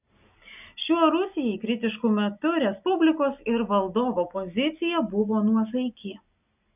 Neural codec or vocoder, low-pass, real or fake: none; 3.6 kHz; real